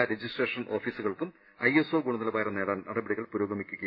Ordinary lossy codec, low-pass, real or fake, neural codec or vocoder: none; 5.4 kHz; real; none